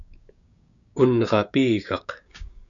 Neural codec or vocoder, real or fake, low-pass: codec, 16 kHz, 6 kbps, DAC; fake; 7.2 kHz